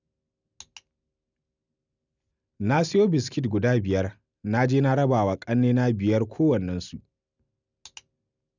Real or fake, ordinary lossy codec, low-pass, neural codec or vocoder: real; none; 7.2 kHz; none